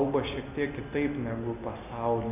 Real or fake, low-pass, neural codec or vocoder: real; 3.6 kHz; none